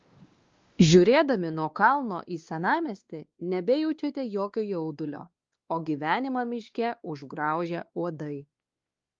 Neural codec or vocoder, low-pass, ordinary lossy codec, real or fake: codec, 16 kHz, 2 kbps, X-Codec, WavLM features, trained on Multilingual LibriSpeech; 7.2 kHz; Opus, 32 kbps; fake